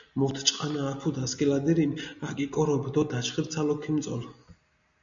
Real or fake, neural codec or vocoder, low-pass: real; none; 7.2 kHz